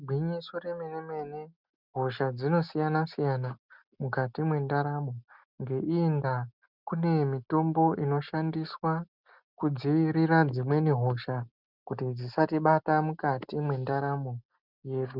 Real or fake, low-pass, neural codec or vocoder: real; 5.4 kHz; none